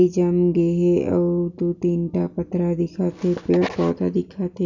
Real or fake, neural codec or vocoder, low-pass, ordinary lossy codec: real; none; 7.2 kHz; none